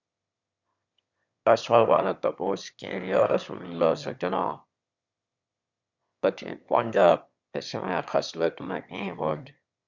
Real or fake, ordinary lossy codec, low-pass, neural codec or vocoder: fake; Opus, 64 kbps; 7.2 kHz; autoencoder, 22.05 kHz, a latent of 192 numbers a frame, VITS, trained on one speaker